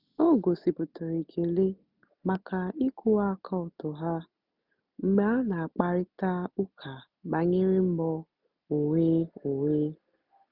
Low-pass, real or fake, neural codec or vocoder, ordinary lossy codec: 5.4 kHz; real; none; none